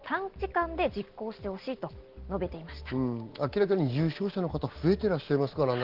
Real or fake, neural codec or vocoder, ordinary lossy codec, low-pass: real; none; Opus, 16 kbps; 5.4 kHz